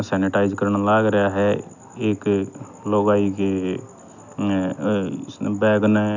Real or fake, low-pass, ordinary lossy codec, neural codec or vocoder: real; 7.2 kHz; none; none